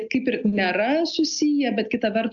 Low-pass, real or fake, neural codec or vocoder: 7.2 kHz; real; none